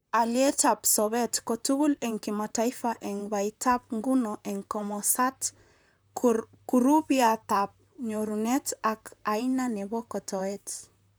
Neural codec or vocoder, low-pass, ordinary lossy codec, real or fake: vocoder, 44.1 kHz, 128 mel bands, Pupu-Vocoder; none; none; fake